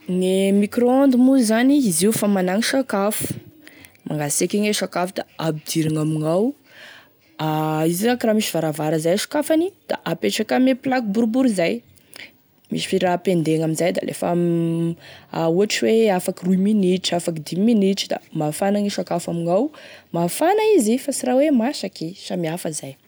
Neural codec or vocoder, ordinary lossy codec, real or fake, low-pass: none; none; real; none